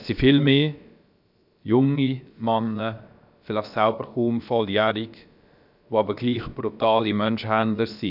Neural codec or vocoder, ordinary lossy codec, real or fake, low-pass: codec, 16 kHz, about 1 kbps, DyCAST, with the encoder's durations; none; fake; 5.4 kHz